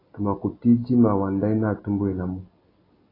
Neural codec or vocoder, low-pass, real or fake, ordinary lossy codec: none; 5.4 kHz; real; AAC, 24 kbps